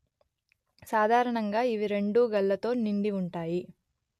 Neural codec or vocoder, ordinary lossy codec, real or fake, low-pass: none; MP3, 64 kbps; real; 14.4 kHz